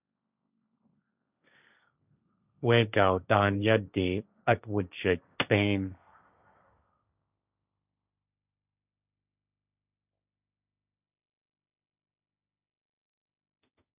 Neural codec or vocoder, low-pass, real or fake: codec, 16 kHz, 1.1 kbps, Voila-Tokenizer; 3.6 kHz; fake